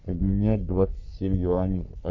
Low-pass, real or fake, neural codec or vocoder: 7.2 kHz; fake; codec, 44.1 kHz, 3.4 kbps, Pupu-Codec